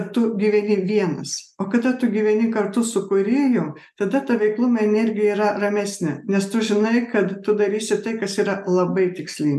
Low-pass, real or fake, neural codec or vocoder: 14.4 kHz; real; none